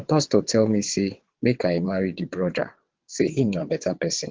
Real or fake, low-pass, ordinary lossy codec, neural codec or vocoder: fake; 7.2 kHz; Opus, 16 kbps; vocoder, 22.05 kHz, 80 mel bands, WaveNeXt